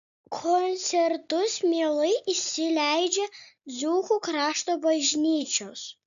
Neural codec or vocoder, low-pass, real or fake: none; 7.2 kHz; real